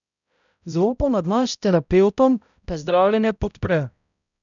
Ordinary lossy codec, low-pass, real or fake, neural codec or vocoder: none; 7.2 kHz; fake; codec, 16 kHz, 0.5 kbps, X-Codec, HuBERT features, trained on balanced general audio